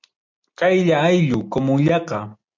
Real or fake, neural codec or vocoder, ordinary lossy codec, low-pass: real; none; MP3, 64 kbps; 7.2 kHz